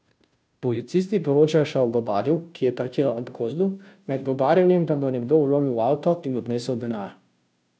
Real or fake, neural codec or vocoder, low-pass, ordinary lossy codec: fake; codec, 16 kHz, 0.5 kbps, FunCodec, trained on Chinese and English, 25 frames a second; none; none